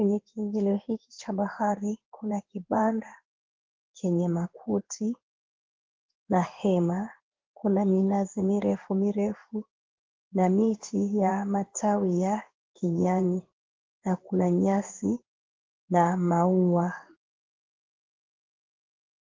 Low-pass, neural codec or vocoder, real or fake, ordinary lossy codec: 7.2 kHz; codec, 16 kHz in and 24 kHz out, 1 kbps, XY-Tokenizer; fake; Opus, 16 kbps